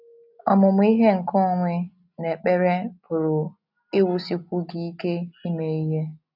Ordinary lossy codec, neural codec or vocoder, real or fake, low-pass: none; none; real; 5.4 kHz